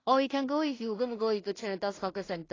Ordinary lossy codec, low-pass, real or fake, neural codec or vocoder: AAC, 32 kbps; 7.2 kHz; fake; codec, 16 kHz in and 24 kHz out, 0.4 kbps, LongCat-Audio-Codec, two codebook decoder